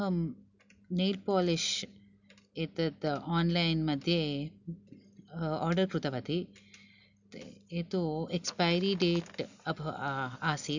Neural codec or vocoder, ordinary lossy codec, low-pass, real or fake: none; none; 7.2 kHz; real